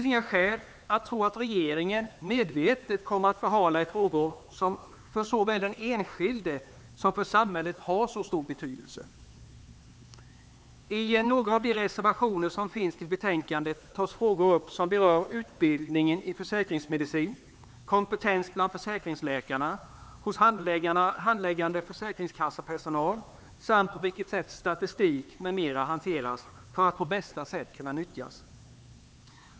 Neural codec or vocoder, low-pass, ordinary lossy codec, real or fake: codec, 16 kHz, 4 kbps, X-Codec, HuBERT features, trained on LibriSpeech; none; none; fake